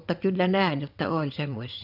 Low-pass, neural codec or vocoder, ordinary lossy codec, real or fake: 5.4 kHz; vocoder, 22.05 kHz, 80 mel bands, WaveNeXt; none; fake